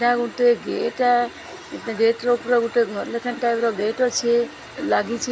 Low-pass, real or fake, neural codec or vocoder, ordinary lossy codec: none; real; none; none